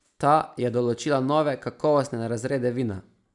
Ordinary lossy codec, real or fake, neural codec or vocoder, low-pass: none; real; none; 10.8 kHz